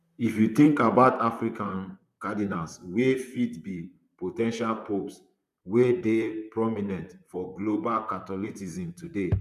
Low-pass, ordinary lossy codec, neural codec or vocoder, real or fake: 14.4 kHz; none; vocoder, 44.1 kHz, 128 mel bands, Pupu-Vocoder; fake